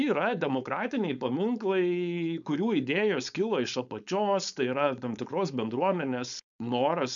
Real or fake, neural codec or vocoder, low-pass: fake; codec, 16 kHz, 4.8 kbps, FACodec; 7.2 kHz